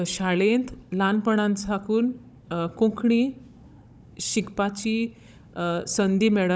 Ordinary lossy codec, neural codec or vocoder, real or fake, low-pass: none; codec, 16 kHz, 16 kbps, FunCodec, trained on Chinese and English, 50 frames a second; fake; none